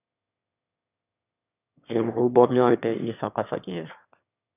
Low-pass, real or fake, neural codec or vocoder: 3.6 kHz; fake; autoencoder, 22.05 kHz, a latent of 192 numbers a frame, VITS, trained on one speaker